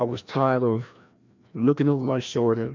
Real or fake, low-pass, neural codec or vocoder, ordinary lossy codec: fake; 7.2 kHz; codec, 16 kHz, 1 kbps, FreqCodec, larger model; MP3, 64 kbps